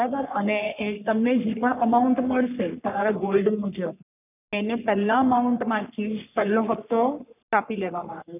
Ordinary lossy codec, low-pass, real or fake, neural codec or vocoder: none; 3.6 kHz; fake; codec, 44.1 kHz, 3.4 kbps, Pupu-Codec